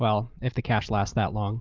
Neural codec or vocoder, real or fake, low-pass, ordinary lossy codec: none; real; 7.2 kHz; Opus, 24 kbps